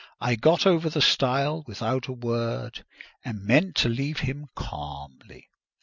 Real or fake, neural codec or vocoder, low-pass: real; none; 7.2 kHz